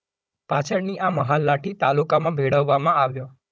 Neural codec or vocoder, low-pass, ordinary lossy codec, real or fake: codec, 16 kHz, 16 kbps, FunCodec, trained on Chinese and English, 50 frames a second; none; none; fake